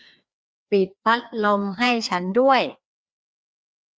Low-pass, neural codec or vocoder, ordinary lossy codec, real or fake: none; codec, 16 kHz, 2 kbps, FreqCodec, larger model; none; fake